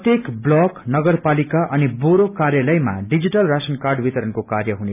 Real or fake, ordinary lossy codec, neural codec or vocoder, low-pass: real; none; none; 3.6 kHz